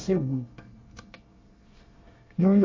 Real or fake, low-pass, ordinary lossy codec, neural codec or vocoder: fake; 7.2 kHz; MP3, 32 kbps; codec, 24 kHz, 1 kbps, SNAC